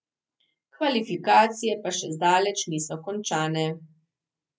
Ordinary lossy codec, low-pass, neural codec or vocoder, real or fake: none; none; none; real